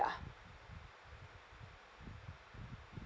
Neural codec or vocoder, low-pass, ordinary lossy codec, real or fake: none; none; none; real